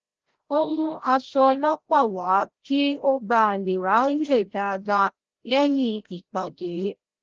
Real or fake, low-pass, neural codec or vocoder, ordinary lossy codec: fake; 7.2 kHz; codec, 16 kHz, 0.5 kbps, FreqCodec, larger model; Opus, 16 kbps